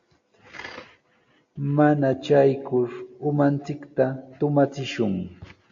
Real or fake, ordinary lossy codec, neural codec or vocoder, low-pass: real; MP3, 48 kbps; none; 7.2 kHz